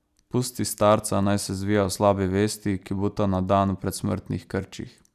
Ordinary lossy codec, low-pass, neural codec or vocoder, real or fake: none; 14.4 kHz; none; real